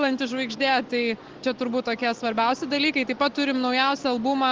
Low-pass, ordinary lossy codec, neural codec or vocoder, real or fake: 7.2 kHz; Opus, 16 kbps; none; real